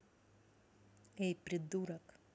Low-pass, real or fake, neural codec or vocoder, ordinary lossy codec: none; real; none; none